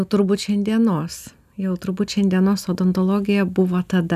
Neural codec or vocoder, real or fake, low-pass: none; real; 14.4 kHz